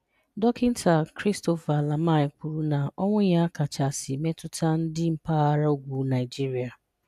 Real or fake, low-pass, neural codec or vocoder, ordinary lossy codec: real; 14.4 kHz; none; none